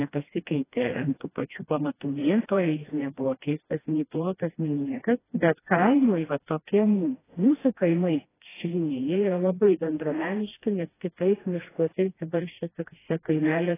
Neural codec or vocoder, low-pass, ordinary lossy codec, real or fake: codec, 16 kHz, 1 kbps, FreqCodec, smaller model; 3.6 kHz; AAC, 16 kbps; fake